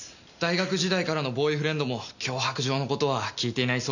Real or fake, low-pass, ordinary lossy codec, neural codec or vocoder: real; 7.2 kHz; none; none